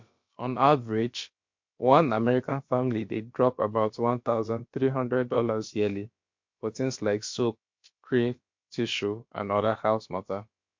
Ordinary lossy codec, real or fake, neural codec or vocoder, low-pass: MP3, 48 kbps; fake; codec, 16 kHz, about 1 kbps, DyCAST, with the encoder's durations; 7.2 kHz